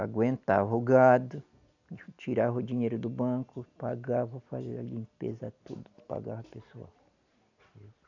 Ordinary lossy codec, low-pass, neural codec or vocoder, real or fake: none; 7.2 kHz; none; real